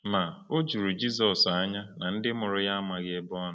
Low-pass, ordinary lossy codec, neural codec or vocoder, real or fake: none; none; none; real